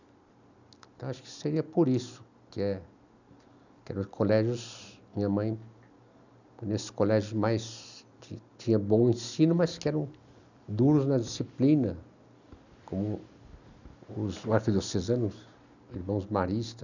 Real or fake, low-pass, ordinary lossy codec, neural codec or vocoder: real; 7.2 kHz; none; none